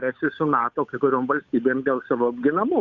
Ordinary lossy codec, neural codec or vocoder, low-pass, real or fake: MP3, 64 kbps; codec, 16 kHz, 8 kbps, FunCodec, trained on Chinese and English, 25 frames a second; 7.2 kHz; fake